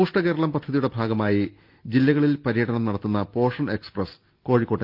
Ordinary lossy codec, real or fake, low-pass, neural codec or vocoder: Opus, 24 kbps; real; 5.4 kHz; none